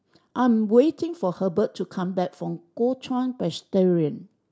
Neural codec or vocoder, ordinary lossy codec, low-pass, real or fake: none; none; none; real